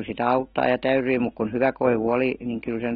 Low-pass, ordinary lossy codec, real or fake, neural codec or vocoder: 19.8 kHz; AAC, 16 kbps; fake; autoencoder, 48 kHz, 128 numbers a frame, DAC-VAE, trained on Japanese speech